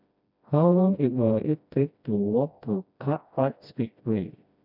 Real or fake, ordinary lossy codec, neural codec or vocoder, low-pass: fake; none; codec, 16 kHz, 1 kbps, FreqCodec, smaller model; 5.4 kHz